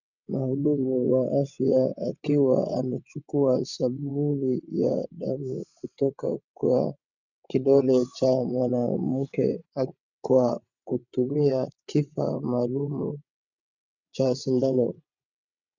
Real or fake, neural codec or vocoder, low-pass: fake; vocoder, 22.05 kHz, 80 mel bands, WaveNeXt; 7.2 kHz